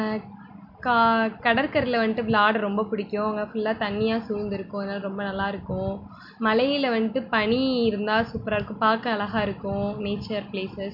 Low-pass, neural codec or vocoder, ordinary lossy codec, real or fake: 5.4 kHz; none; MP3, 48 kbps; real